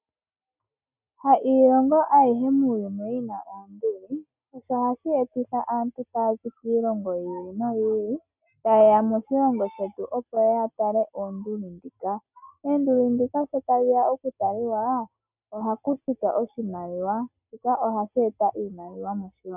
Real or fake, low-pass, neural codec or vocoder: real; 3.6 kHz; none